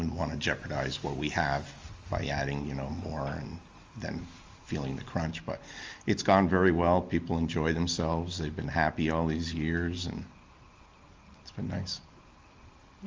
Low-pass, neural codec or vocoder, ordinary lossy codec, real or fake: 7.2 kHz; none; Opus, 32 kbps; real